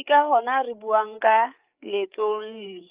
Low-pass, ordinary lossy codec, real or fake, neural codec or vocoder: 3.6 kHz; Opus, 32 kbps; fake; codec, 16 kHz, 8 kbps, FreqCodec, larger model